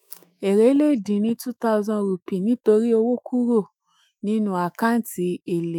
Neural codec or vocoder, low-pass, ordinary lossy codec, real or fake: autoencoder, 48 kHz, 128 numbers a frame, DAC-VAE, trained on Japanese speech; 19.8 kHz; none; fake